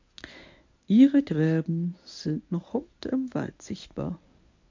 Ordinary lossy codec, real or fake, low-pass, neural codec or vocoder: MP3, 48 kbps; fake; 7.2 kHz; codec, 24 kHz, 0.9 kbps, WavTokenizer, medium speech release version 1